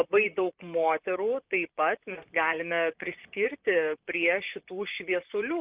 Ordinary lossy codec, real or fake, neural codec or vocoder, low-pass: Opus, 32 kbps; fake; vocoder, 44.1 kHz, 128 mel bands, Pupu-Vocoder; 3.6 kHz